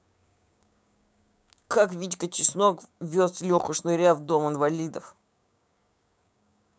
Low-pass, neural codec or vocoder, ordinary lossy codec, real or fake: none; codec, 16 kHz, 6 kbps, DAC; none; fake